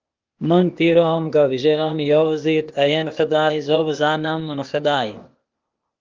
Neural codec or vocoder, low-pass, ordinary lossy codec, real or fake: codec, 16 kHz, 0.8 kbps, ZipCodec; 7.2 kHz; Opus, 24 kbps; fake